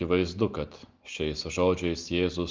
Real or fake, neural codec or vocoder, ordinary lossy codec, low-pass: real; none; Opus, 32 kbps; 7.2 kHz